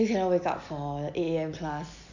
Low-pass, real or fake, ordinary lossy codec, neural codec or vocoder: 7.2 kHz; real; none; none